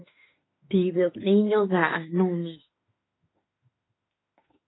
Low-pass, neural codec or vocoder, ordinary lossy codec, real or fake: 7.2 kHz; autoencoder, 48 kHz, 32 numbers a frame, DAC-VAE, trained on Japanese speech; AAC, 16 kbps; fake